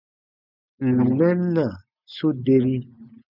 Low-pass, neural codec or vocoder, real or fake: 5.4 kHz; none; real